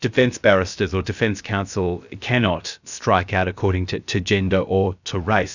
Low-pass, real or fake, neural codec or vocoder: 7.2 kHz; fake; codec, 16 kHz, about 1 kbps, DyCAST, with the encoder's durations